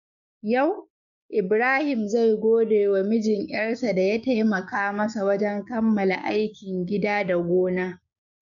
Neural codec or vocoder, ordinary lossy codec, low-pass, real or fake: codec, 16 kHz, 6 kbps, DAC; none; 7.2 kHz; fake